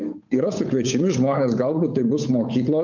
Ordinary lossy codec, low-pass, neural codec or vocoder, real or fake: MP3, 64 kbps; 7.2 kHz; codec, 16 kHz, 8 kbps, FunCodec, trained on Chinese and English, 25 frames a second; fake